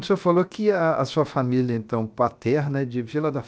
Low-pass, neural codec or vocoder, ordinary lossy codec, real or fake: none; codec, 16 kHz, 0.7 kbps, FocalCodec; none; fake